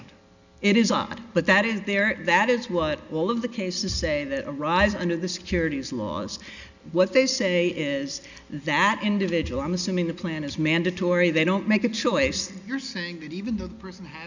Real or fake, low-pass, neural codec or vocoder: real; 7.2 kHz; none